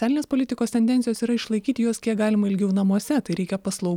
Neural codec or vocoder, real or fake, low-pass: none; real; 19.8 kHz